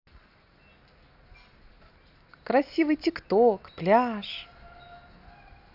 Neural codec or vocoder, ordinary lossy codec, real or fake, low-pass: none; none; real; 5.4 kHz